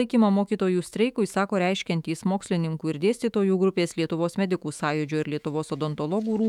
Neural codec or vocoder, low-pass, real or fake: autoencoder, 48 kHz, 128 numbers a frame, DAC-VAE, trained on Japanese speech; 19.8 kHz; fake